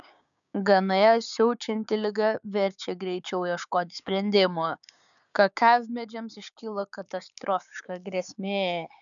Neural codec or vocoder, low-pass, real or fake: codec, 16 kHz, 6 kbps, DAC; 7.2 kHz; fake